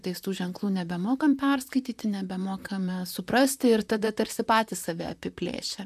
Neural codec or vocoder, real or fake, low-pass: vocoder, 44.1 kHz, 128 mel bands, Pupu-Vocoder; fake; 14.4 kHz